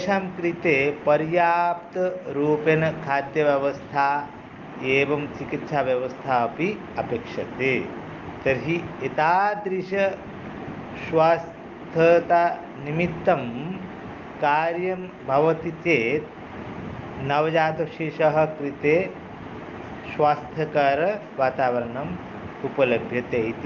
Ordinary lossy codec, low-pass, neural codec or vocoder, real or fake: Opus, 32 kbps; 7.2 kHz; none; real